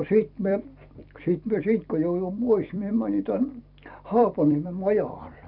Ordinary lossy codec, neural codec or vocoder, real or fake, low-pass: none; vocoder, 44.1 kHz, 128 mel bands every 256 samples, BigVGAN v2; fake; 5.4 kHz